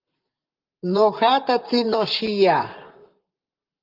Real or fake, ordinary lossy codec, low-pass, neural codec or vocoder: fake; Opus, 32 kbps; 5.4 kHz; vocoder, 44.1 kHz, 128 mel bands, Pupu-Vocoder